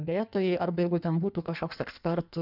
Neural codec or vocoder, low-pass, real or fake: codec, 16 kHz in and 24 kHz out, 1.1 kbps, FireRedTTS-2 codec; 5.4 kHz; fake